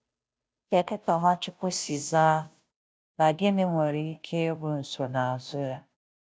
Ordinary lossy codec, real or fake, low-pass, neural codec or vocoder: none; fake; none; codec, 16 kHz, 0.5 kbps, FunCodec, trained on Chinese and English, 25 frames a second